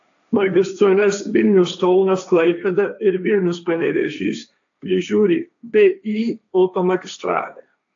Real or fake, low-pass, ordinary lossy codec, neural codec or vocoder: fake; 7.2 kHz; AAC, 64 kbps; codec, 16 kHz, 1.1 kbps, Voila-Tokenizer